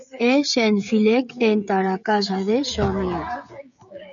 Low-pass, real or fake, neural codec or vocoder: 7.2 kHz; fake; codec, 16 kHz, 16 kbps, FreqCodec, smaller model